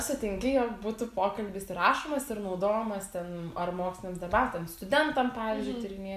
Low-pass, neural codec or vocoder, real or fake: 14.4 kHz; none; real